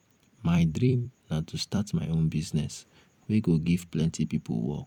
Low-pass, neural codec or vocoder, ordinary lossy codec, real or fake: 19.8 kHz; vocoder, 44.1 kHz, 128 mel bands every 256 samples, BigVGAN v2; none; fake